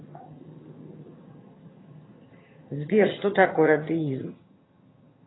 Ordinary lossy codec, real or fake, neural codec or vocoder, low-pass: AAC, 16 kbps; fake; vocoder, 22.05 kHz, 80 mel bands, HiFi-GAN; 7.2 kHz